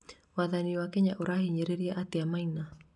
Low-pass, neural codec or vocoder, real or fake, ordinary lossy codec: 10.8 kHz; none; real; none